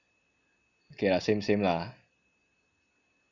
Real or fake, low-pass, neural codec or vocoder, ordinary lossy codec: real; 7.2 kHz; none; none